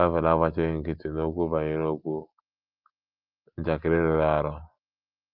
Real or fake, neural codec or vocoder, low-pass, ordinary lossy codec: real; none; 5.4 kHz; Opus, 24 kbps